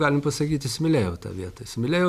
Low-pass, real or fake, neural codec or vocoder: 14.4 kHz; real; none